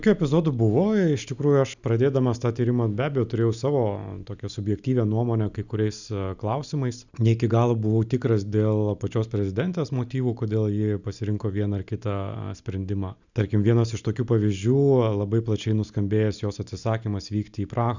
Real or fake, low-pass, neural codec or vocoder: real; 7.2 kHz; none